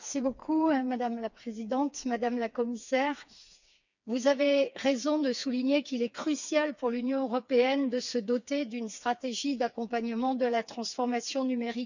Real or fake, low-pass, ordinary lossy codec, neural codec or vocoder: fake; 7.2 kHz; none; codec, 16 kHz, 4 kbps, FreqCodec, smaller model